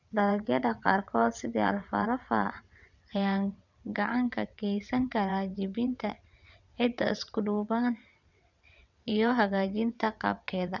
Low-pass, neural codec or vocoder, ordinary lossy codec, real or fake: 7.2 kHz; vocoder, 22.05 kHz, 80 mel bands, WaveNeXt; none; fake